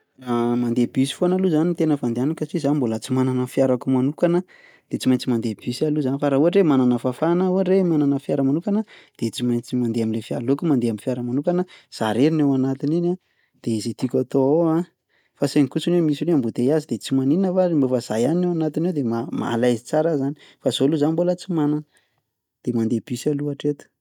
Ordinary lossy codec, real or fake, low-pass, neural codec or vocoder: none; real; 19.8 kHz; none